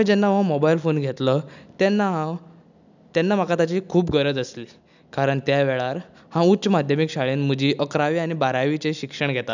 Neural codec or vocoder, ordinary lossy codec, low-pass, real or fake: none; none; 7.2 kHz; real